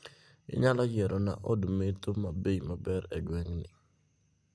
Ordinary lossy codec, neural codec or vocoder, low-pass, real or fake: none; none; none; real